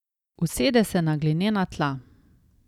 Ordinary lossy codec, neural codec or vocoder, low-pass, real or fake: none; none; 19.8 kHz; real